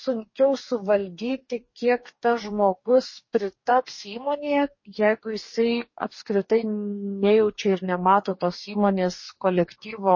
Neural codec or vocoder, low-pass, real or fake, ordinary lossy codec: codec, 44.1 kHz, 2.6 kbps, DAC; 7.2 kHz; fake; MP3, 32 kbps